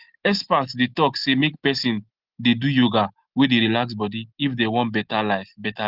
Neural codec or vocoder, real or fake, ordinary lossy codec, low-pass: none; real; Opus, 16 kbps; 5.4 kHz